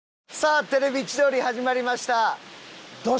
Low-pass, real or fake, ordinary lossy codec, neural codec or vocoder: none; real; none; none